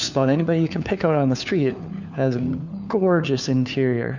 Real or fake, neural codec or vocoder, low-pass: fake; codec, 16 kHz, 4 kbps, FunCodec, trained on LibriTTS, 50 frames a second; 7.2 kHz